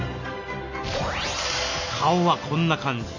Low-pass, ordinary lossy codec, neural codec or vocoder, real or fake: 7.2 kHz; none; none; real